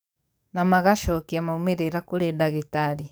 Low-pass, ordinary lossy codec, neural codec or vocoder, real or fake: none; none; codec, 44.1 kHz, 7.8 kbps, DAC; fake